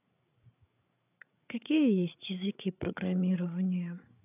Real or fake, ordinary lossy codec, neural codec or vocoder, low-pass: fake; none; codec, 16 kHz, 8 kbps, FreqCodec, larger model; 3.6 kHz